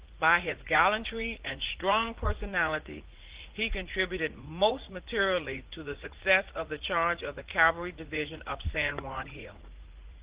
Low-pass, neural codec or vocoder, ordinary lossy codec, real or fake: 3.6 kHz; vocoder, 44.1 kHz, 80 mel bands, Vocos; Opus, 16 kbps; fake